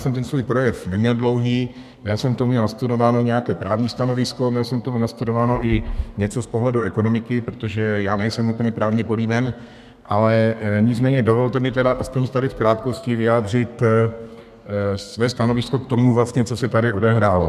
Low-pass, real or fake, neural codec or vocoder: 14.4 kHz; fake; codec, 32 kHz, 1.9 kbps, SNAC